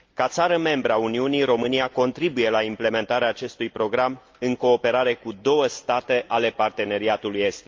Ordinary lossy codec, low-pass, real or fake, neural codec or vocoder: Opus, 24 kbps; 7.2 kHz; real; none